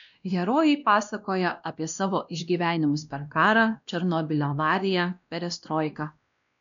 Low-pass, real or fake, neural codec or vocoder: 7.2 kHz; fake; codec, 16 kHz, 1 kbps, X-Codec, WavLM features, trained on Multilingual LibriSpeech